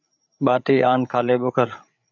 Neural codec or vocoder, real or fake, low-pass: codec, 16 kHz, 8 kbps, FreqCodec, larger model; fake; 7.2 kHz